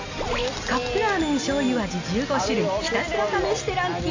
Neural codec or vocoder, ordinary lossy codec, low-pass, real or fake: none; AAC, 48 kbps; 7.2 kHz; real